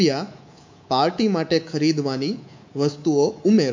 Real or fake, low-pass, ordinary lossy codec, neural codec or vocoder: fake; 7.2 kHz; MP3, 48 kbps; codec, 24 kHz, 3.1 kbps, DualCodec